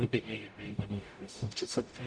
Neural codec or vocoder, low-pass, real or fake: codec, 44.1 kHz, 0.9 kbps, DAC; 9.9 kHz; fake